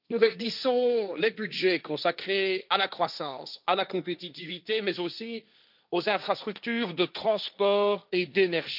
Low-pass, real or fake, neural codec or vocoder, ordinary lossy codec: 5.4 kHz; fake; codec, 16 kHz, 1.1 kbps, Voila-Tokenizer; none